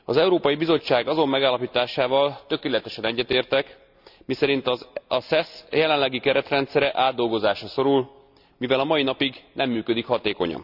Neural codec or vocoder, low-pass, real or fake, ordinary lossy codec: none; 5.4 kHz; real; none